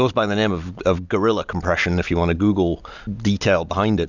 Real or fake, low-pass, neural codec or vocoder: real; 7.2 kHz; none